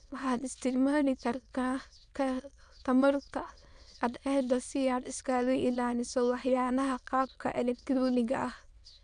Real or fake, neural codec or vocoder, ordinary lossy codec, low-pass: fake; autoencoder, 22.05 kHz, a latent of 192 numbers a frame, VITS, trained on many speakers; none; 9.9 kHz